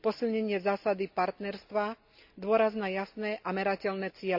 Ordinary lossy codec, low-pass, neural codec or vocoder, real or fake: AAC, 48 kbps; 5.4 kHz; none; real